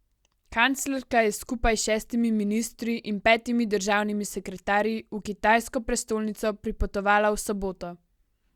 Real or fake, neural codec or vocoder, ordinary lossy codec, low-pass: real; none; Opus, 64 kbps; 19.8 kHz